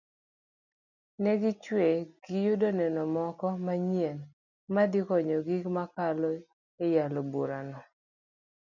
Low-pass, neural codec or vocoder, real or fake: 7.2 kHz; none; real